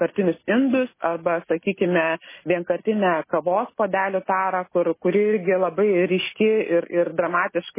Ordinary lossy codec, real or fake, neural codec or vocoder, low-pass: MP3, 16 kbps; real; none; 3.6 kHz